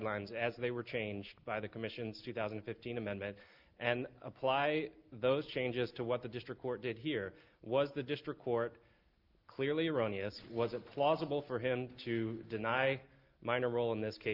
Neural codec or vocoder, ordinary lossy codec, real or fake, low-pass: none; Opus, 32 kbps; real; 5.4 kHz